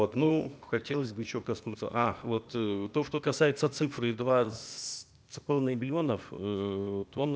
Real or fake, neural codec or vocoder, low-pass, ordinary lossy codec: fake; codec, 16 kHz, 0.8 kbps, ZipCodec; none; none